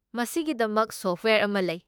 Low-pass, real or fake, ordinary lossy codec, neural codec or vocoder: none; fake; none; autoencoder, 48 kHz, 32 numbers a frame, DAC-VAE, trained on Japanese speech